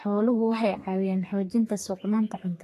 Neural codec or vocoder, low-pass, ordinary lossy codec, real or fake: codec, 32 kHz, 1.9 kbps, SNAC; 14.4 kHz; AAC, 48 kbps; fake